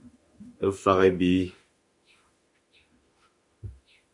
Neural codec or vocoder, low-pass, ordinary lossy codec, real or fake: autoencoder, 48 kHz, 32 numbers a frame, DAC-VAE, trained on Japanese speech; 10.8 kHz; MP3, 48 kbps; fake